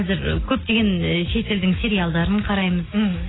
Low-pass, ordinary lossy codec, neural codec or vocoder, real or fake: 7.2 kHz; AAC, 16 kbps; none; real